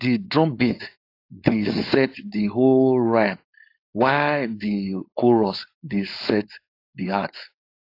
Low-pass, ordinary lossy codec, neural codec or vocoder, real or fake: 5.4 kHz; AAC, 32 kbps; codec, 16 kHz, 4.8 kbps, FACodec; fake